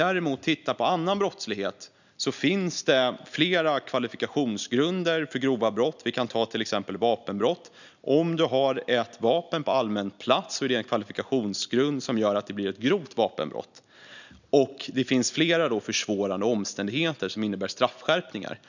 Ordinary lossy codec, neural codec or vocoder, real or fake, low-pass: none; none; real; 7.2 kHz